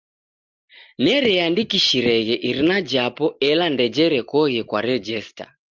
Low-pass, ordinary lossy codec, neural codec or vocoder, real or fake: 7.2 kHz; Opus, 32 kbps; none; real